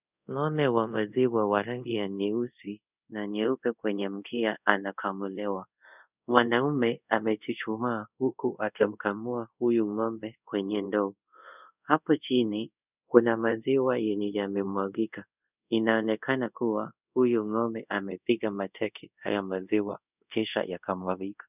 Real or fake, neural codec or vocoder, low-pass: fake; codec, 24 kHz, 0.5 kbps, DualCodec; 3.6 kHz